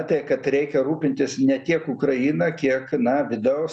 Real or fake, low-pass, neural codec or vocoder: real; 9.9 kHz; none